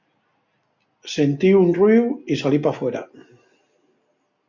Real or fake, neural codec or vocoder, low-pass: real; none; 7.2 kHz